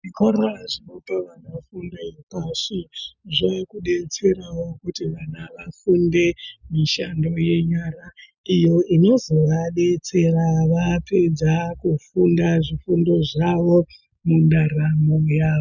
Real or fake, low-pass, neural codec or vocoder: real; 7.2 kHz; none